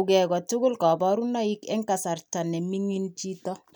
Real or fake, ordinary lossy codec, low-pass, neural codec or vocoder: real; none; none; none